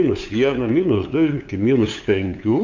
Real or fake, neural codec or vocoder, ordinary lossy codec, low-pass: fake; codec, 16 kHz, 8 kbps, FunCodec, trained on LibriTTS, 25 frames a second; MP3, 64 kbps; 7.2 kHz